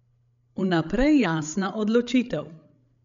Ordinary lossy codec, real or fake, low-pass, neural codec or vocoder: none; fake; 7.2 kHz; codec, 16 kHz, 8 kbps, FreqCodec, larger model